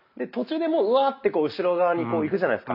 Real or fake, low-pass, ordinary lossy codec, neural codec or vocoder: real; 5.4 kHz; none; none